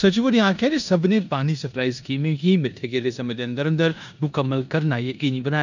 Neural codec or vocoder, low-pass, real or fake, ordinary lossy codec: codec, 16 kHz in and 24 kHz out, 0.9 kbps, LongCat-Audio-Codec, four codebook decoder; 7.2 kHz; fake; none